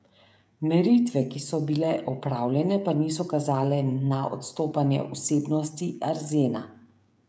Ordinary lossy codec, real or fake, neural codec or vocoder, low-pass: none; fake; codec, 16 kHz, 16 kbps, FreqCodec, smaller model; none